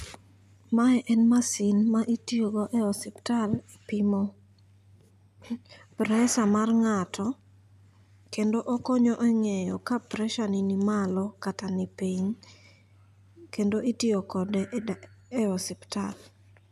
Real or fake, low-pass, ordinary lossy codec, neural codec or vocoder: real; 14.4 kHz; none; none